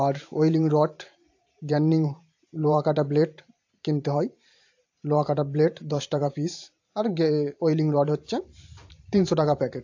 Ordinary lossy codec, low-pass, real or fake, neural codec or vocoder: AAC, 48 kbps; 7.2 kHz; fake; vocoder, 44.1 kHz, 128 mel bands every 512 samples, BigVGAN v2